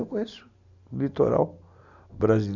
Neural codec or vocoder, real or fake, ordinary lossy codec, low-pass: none; real; none; 7.2 kHz